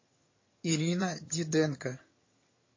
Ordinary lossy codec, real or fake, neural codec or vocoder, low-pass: MP3, 32 kbps; fake; vocoder, 22.05 kHz, 80 mel bands, HiFi-GAN; 7.2 kHz